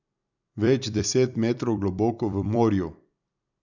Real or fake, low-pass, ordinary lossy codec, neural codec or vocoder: fake; 7.2 kHz; none; vocoder, 44.1 kHz, 128 mel bands every 256 samples, BigVGAN v2